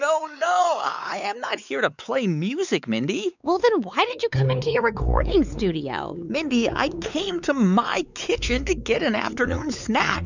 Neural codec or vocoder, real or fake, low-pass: codec, 16 kHz, 4 kbps, X-Codec, WavLM features, trained on Multilingual LibriSpeech; fake; 7.2 kHz